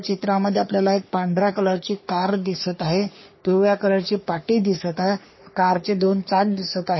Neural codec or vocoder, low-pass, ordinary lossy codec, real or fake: codec, 44.1 kHz, 3.4 kbps, Pupu-Codec; 7.2 kHz; MP3, 24 kbps; fake